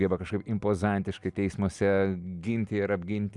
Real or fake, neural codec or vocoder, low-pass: real; none; 10.8 kHz